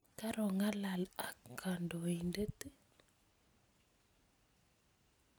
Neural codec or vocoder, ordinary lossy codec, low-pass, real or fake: none; none; none; real